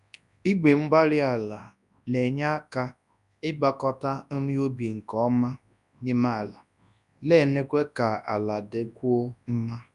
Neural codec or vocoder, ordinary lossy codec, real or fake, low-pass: codec, 24 kHz, 0.9 kbps, WavTokenizer, large speech release; none; fake; 10.8 kHz